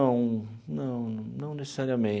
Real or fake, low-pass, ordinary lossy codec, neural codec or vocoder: real; none; none; none